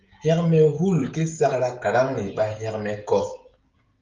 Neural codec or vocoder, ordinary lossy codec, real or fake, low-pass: codec, 16 kHz, 16 kbps, FreqCodec, smaller model; Opus, 32 kbps; fake; 7.2 kHz